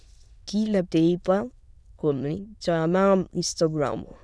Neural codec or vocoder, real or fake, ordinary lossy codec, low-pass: autoencoder, 22.05 kHz, a latent of 192 numbers a frame, VITS, trained on many speakers; fake; none; none